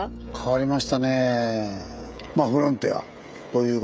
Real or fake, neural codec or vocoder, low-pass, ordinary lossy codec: fake; codec, 16 kHz, 16 kbps, FreqCodec, smaller model; none; none